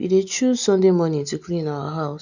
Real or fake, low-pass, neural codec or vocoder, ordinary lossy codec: real; 7.2 kHz; none; none